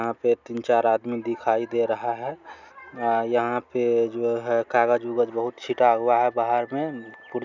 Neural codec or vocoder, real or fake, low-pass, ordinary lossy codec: none; real; 7.2 kHz; none